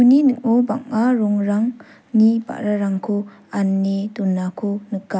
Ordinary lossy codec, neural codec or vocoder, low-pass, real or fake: none; none; none; real